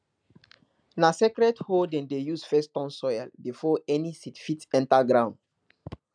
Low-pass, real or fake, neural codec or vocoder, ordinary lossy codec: 9.9 kHz; real; none; none